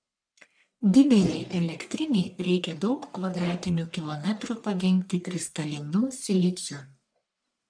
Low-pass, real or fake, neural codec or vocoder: 9.9 kHz; fake; codec, 44.1 kHz, 1.7 kbps, Pupu-Codec